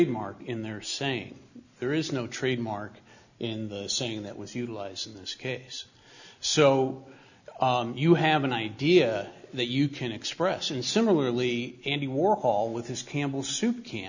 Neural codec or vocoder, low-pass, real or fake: none; 7.2 kHz; real